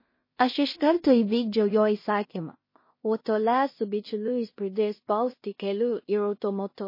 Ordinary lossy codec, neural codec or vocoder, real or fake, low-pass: MP3, 24 kbps; codec, 16 kHz in and 24 kHz out, 0.4 kbps, LongCat-Audio-Codec, two codebook decoder; fake; 5.4 kHz